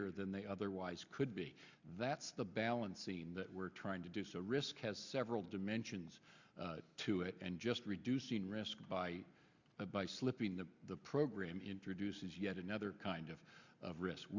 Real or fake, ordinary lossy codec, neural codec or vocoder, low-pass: real; Opus, 64 kbps; none; 7.2 kHz